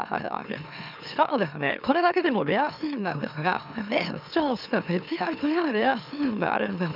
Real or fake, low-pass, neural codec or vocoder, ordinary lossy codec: fake; 5.4 kHz; autoencoder, 44.1 kHz, a latent of 192 numbers a frame, MeloTTS; none